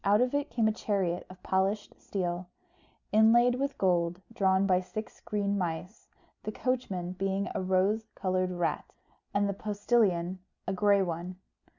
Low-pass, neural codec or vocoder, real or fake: 7.2 kHz; none; real